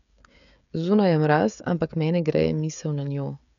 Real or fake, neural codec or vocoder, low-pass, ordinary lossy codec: fake; codec, 16 kHz, 16 kbps, FreqCodec, smaller model; 7.2 kHz; none